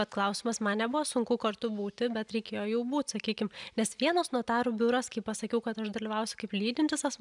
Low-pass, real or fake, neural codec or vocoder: 10.8 kHz; real; none